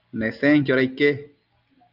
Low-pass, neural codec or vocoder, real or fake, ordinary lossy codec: 5.4 kHz; none; real; Opus, 24 kbps